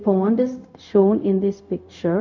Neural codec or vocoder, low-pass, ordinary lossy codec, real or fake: codec, 16 kHz, 0.4 kbps, LongCat-Audio-Codec; 7.2 kHz; none; fake